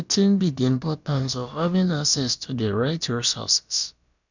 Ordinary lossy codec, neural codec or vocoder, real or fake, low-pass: none; codec, 16 kHz, about 1 kbps, DyCAST, with the encoder's durations; fake; 7.2 kHz